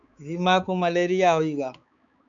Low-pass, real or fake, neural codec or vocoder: 7.2 kHz; fake; codec, 16 kHz, 4 kbps, X-Codec, HuBERT features, trained on balanced general audio